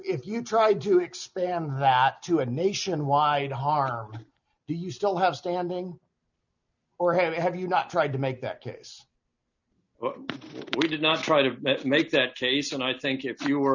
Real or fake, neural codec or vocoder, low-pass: real; none; 7.2 kHz